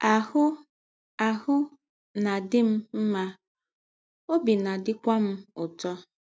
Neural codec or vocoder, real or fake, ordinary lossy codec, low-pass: none; real; none; none